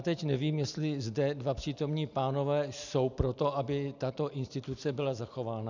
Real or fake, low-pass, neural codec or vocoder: real; 7.2 kHz; none